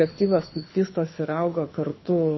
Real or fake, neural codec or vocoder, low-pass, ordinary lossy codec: fake; codec, 24 kHz, 6 kbps, HILCodec; 7.2 kHz; MP3, 24 kbps